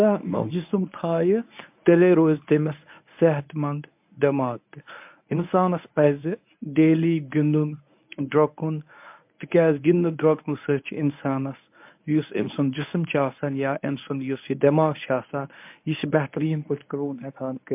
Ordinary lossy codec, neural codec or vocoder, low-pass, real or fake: MP3, 32 kbps; codec, 24 kHz, 0.9 kbps, WavTokenizer, medium speech release version 1; 3.6 kHz; fake